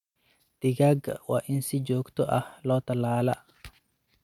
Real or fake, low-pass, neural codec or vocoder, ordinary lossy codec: real; 19.8 kHz; none; MP3, 96 kbps